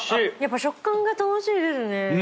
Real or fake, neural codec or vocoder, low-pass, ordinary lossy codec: real; none; none; none